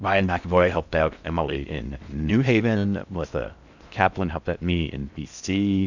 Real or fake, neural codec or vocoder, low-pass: fake; codec, 16 kHz in and 24 kHz out, 0.6 kbps, FocalCodec, streaming, 4096 codes; 7.2 kHz